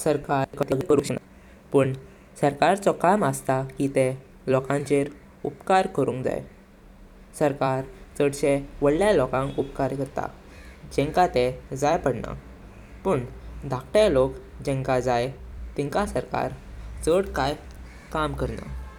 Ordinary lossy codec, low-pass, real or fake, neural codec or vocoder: none; 19.8 kHz; fake; vocoder, 44.1 kHz, 128 mel bands every 256 samples, BigVGAN v2